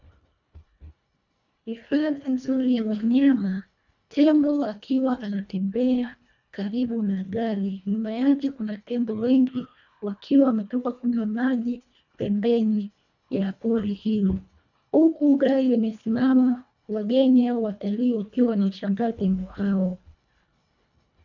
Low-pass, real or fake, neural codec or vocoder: 7.2 kHz; fake; codec, 24 kHz, 1.5 kbps, HILCodec